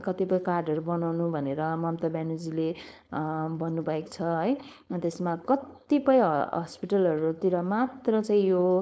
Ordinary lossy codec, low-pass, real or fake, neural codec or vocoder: none; none; fake; codec, 16 kHz, 4.8 kbps, FACodec